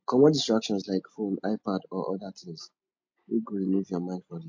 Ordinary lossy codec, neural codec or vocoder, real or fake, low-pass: MP3, 48 kbps; none; real; 7.2 kHz